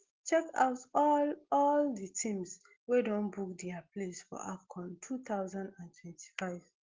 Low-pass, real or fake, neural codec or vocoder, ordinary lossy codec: 7.2 kHz; real; none; Opus, 16 kbps